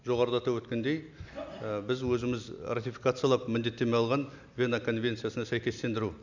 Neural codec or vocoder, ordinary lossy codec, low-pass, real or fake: none; none; 7.2 kHz; real